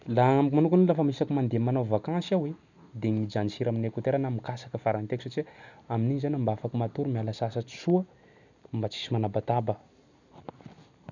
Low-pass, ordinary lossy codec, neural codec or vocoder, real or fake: 7.2 kHz; none; none; real